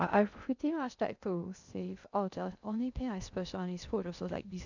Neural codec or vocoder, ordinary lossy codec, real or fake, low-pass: codec, 16 kHz in and 24 kHz out, 0.6 kbps, FocalCodec, streaming, 2048 codes; none; fake; 7.2 kHz